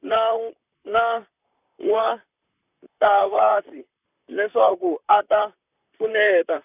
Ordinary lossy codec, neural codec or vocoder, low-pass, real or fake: none; vocoder, 44.1 kHz, 128 mel bands, Pupu-Vocoder; 3.6 kHz; fake